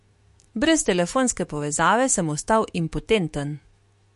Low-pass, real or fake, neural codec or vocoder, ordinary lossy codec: 14.4 kHz; fake; autoencoder, 48 kHz, 128 numbers a frame, DAC-VAE, trained on Japanese speech; MP3, 48 kbps